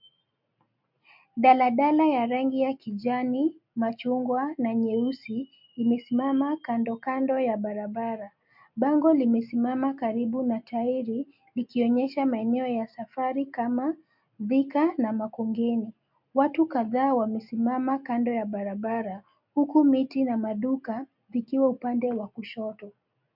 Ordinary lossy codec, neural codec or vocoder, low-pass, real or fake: MP3, 48 kbps; none; 5.4 kHz; real